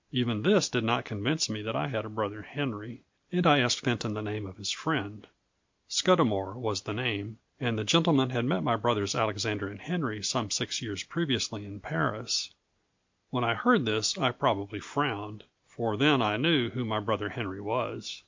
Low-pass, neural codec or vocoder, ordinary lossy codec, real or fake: 7.2 kHz; none; MP3, 48 kbps; real